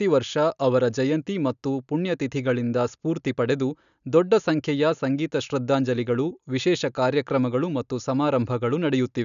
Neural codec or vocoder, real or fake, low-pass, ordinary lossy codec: none; real; 7.2 kHz; none